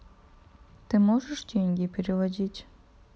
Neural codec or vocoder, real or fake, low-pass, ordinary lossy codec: none; real; none; none